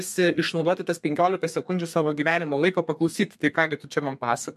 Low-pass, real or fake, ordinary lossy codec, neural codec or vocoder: 14.4 kHz; fake; MP3, 64 kbps; codec, 32 kHz, 1.9 kbps, SNAC